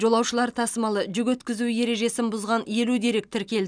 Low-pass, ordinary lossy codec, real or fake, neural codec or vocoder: 9.9 kHz; none; real; none